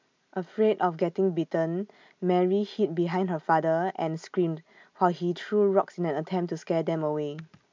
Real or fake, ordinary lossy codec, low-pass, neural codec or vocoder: real; MP3, 64 kbps; 7.2 kHz; none